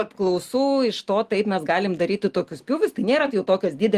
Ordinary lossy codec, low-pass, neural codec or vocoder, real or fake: Opus, 24 kbps; 14.4 kHz; none; real